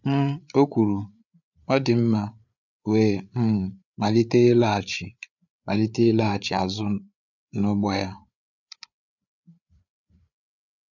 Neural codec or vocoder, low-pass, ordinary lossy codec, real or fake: codec, 16 kHz, 8 kbps, FreqCodec, larger model; 7.2 kHz; none; fake